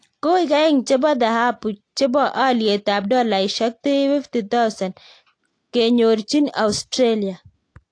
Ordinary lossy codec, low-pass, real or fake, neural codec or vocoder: AAC, 48 kbps; 9.9 kHz; real; none